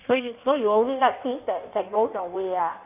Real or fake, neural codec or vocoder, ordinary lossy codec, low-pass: fake; codec, 16 kHz in and 24 kHz out, 1.1 kbps, FireRedTTS-2 codec; none; 3.6 kHz